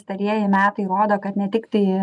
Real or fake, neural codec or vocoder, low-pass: real; none; 10.8 kHz